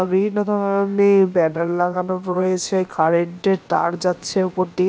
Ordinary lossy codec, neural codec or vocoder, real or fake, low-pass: none; codec, 16 kHz, about 1 kbps, DyCAST, with the encoder's durations; fake; none